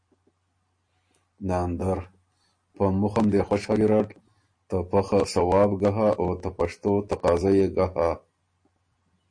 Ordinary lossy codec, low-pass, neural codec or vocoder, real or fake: MP3, 48 kbps; 9.9 kHz; none; real